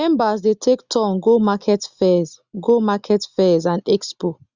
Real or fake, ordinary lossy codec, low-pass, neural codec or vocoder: real; Opus, 64 kbps; 7.2 kHz; none